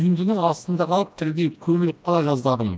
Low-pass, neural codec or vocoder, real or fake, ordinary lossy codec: none; codec, 16 kHz, 1 kbps, FreqCodec, smaller model; fake; none